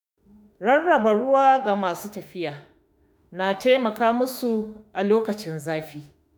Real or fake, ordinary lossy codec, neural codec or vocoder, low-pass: fake; none; autoencoder, 48 kHz, 32 numbers a frame, DAC-VAE, trained on Japanese speech; none